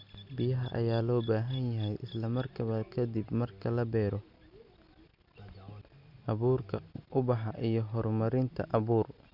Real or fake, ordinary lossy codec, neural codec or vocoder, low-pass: real; none; none; 5.4 kHz